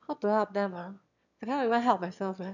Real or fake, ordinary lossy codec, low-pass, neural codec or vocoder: fake; none; 7.2 kHz; autoencoder, 22.05 kHz, a latent of 192 numbers a frame, VITS, trained on one speaker